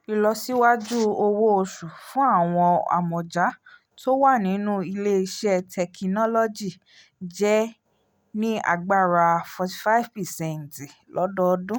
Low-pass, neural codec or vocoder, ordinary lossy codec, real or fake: none; none; none; real